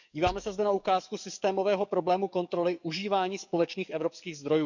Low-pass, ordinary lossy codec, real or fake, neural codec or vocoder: 7.2 kHz; none; fake; codec, 44.1 kHz, 7.8 kbps, DAC